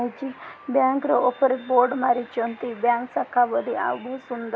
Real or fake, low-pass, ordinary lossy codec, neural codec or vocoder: real; none; none; none